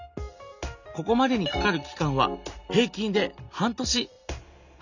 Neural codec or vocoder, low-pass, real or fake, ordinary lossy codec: none; 7.2 kHz; real; none